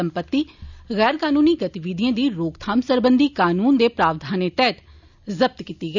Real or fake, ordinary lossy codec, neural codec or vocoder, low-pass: real; none; none; 7.2 kHz